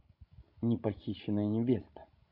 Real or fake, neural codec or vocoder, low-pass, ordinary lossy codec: fake; codec, 16 kHz, 16 kbps, FreqCodec, larger model; 5.4 kHz; none